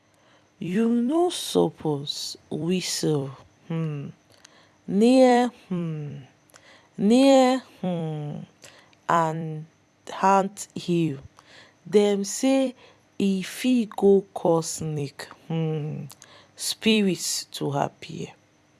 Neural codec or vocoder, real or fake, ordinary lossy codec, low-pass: vocoder, 44.1 kHz, 128 mel bands every 256 samples, BigVGAN v2; fake; none; 14.4 kHz